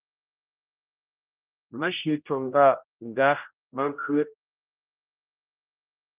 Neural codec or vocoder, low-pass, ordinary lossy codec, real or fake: codec, 16 kHz, 0.5 kbps, X-Codec, HuBERT features, trained on general audio; 3.6 kHz; Opus, 32 kbps; fake